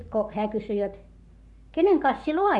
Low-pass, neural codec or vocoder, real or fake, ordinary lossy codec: 10.8 kHz; codec, 44.1 kHz, 7.8 kbps, Pupu-Codec; fake; none